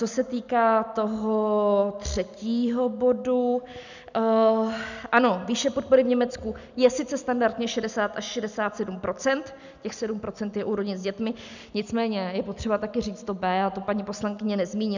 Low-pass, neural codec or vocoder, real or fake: 7.2 kHz; none; real